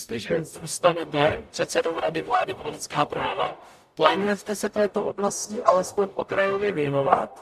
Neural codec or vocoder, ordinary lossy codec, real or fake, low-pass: codec, 44.1 kHz, 0.9 kbps, DAC; AAC, 96 kbps; fake; 14.4 kHz